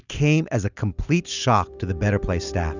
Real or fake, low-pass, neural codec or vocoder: real; 7.2 kHz; none